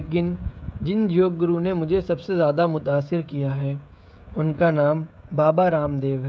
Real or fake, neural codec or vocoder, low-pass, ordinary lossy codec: fake; codec, 16 kHz, 16 kbps, FreqCodec, smaller model; none; none